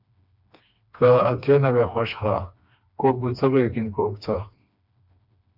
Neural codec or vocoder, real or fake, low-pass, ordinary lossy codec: codec, 16 kHz, 2 kbps, FreqCodec, smaller model; fake; 5.4 kHz; AAC, 48 kbps